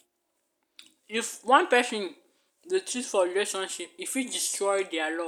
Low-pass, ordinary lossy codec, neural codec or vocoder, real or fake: none; none; none; real